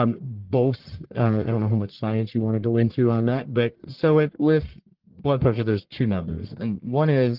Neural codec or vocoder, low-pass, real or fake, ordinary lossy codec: codec, 44.1 kHz, 1.7 kbps, Pupu-Codec; 5.4 kHz; fake; Opus, 16 kbps